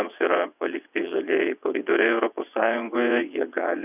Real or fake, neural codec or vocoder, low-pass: fake; vocoder, 22.05 kHz, 80 mel bands, WaveNeXt; 3.6 kHz